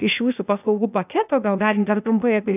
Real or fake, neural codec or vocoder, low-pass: fake; codec, 16 kHz, 0.8 kbps, ZipCodec; 3.6 kHz